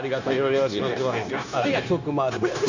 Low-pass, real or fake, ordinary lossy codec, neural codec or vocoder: 7.2 kHz; fake; none; codec, 16 kHz, 0.9 kbps, LongCat-Audio-Codec